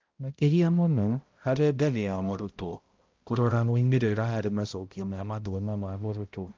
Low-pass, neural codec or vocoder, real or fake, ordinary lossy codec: 7.2 kHz; codec, 16 kHz, 0.5 kbps, X-Codec, HuBERT features, trained on balanced general audio; fake; Opus, 32 kbps